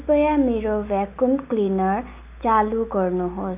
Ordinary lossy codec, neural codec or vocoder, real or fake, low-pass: none; none; real; 3.6 kHz